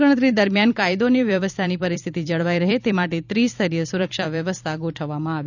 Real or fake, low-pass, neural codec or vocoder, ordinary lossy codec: fake; 7.2 kHz; vocoder, 44.1 kHz, 128 mel bands every 256 samples, BigVGAN v2; none